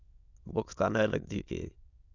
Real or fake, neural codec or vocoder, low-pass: fake; autoencoder, 22.05 kHz, a latent of 192 numbers a frame, VITS, trained on many speakers; 7.2 kHz